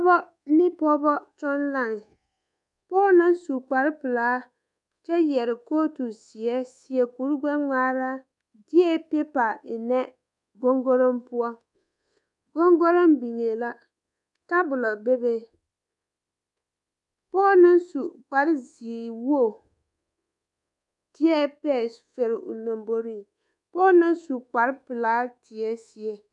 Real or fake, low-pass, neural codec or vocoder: fake; 10.8 kHz; codec, 24 kHz, 1.2 kbps, DualCodec